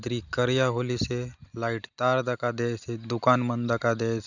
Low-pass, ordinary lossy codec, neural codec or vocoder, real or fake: 7.2 kHz; none; none; real